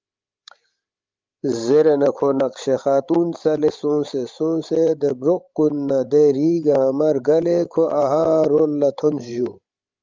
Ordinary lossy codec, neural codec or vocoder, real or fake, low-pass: Opus, 32 kbps; codec, 16 kHz, 16 kbps, FreqCodec, larger model; fake; 7.2 kHz